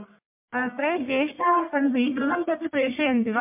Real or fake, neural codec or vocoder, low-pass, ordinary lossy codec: fake; codec, 44.1 kHz, 1.7 kbps, Pupu-Codec; 3.6 kHz; MP3, 32 kbps